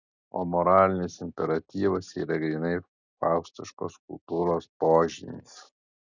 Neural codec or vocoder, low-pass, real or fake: none; 7.2 kHz; real